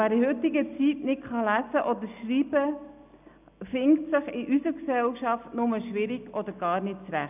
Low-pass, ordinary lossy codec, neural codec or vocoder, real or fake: 3.6 kHz; none; none; real